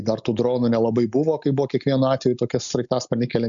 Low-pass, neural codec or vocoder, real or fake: 7.2 kHz; none; real